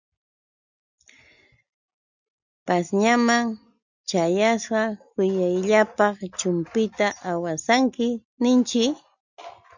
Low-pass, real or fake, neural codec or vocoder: 7.2 kHz; real; none